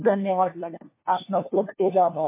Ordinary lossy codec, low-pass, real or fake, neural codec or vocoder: MP3, 24 kbps; 3.6 kHz; fake; codec, 16 kHz, 1 kbps, FunCodec, trained on LibriTTS, 50 frames a second